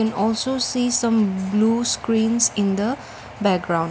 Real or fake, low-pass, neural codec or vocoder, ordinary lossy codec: real; none; none; none